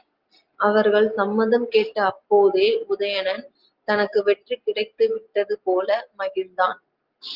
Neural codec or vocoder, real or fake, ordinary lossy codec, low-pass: none; real; Opus, 32 kbps; 5.4 kHz